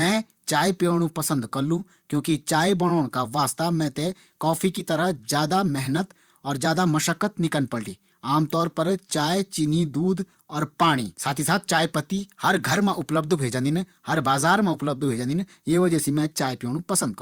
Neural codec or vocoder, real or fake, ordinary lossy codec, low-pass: vocoder, 44.1 kHz, 128 mel bands, Pupu-Vocoder; fake; Opus, 64 kbps; 19.8 kHz